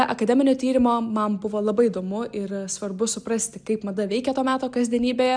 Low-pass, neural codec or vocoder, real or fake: 9.9 kHz; none; real